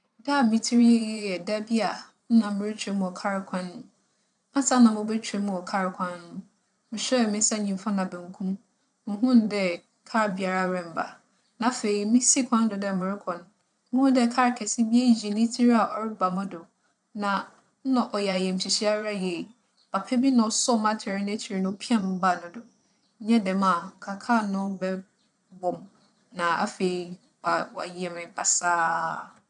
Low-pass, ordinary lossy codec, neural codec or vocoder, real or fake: 9.9 kHz; none; vocoder, 22.05 kHz, 80 mel bands, Vocos; fake